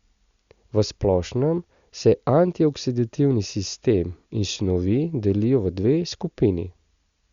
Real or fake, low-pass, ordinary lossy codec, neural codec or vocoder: real; 7.2 kHz; Opus, 64 kbps; none